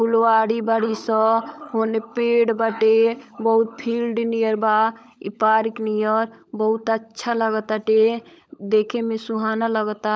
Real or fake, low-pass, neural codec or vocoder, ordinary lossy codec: fake; none; codec, 16 kHz, 16 kbps, FunCodec, trained on LibriTTS, 50 frames a second; none